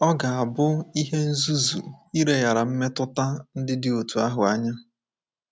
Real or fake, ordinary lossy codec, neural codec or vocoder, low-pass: real; none; none; none